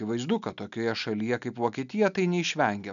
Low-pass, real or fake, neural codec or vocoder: 7.2 kHz; real; none